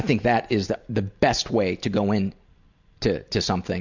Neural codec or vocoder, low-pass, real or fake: none; 7.2 kHz; real